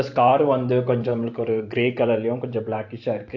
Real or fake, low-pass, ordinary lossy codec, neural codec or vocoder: fake; 7.2 kHz; AAC, 48 kbps; vocoder, 44.1 kHz, 128 mel bands every 512 samples, BigVGAN v2